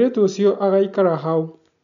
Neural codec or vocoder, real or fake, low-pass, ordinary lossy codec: none; real; 7.2 kHz; none